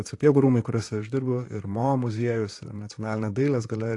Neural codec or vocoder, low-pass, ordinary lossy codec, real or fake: vocoder, 44.1 kHz, 128 mel bands, Pupu-Vocoder; 10.8 kHz; AAC, 48 kbps; fake